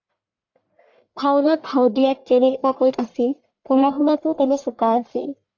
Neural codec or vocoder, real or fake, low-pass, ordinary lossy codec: codec, 44.1 kHz, 1.7 kbps, Pupu-Codec; fake; 7.2 kHz; AAC, 48 kbps